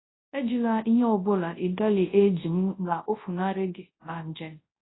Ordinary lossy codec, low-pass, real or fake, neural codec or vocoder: AAC, 16 kbps; 7.2 kHz; fake; codec, 24 kHz, 0.9 kbps, WavTokenizer, large speech release